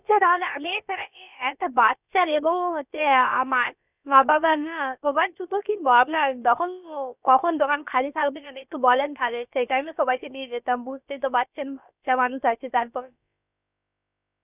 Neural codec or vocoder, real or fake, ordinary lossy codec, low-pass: codec, 16 kHz, about 1 kbps, DyCAST, with the encoder's durations; fake; none; 3.6 kHz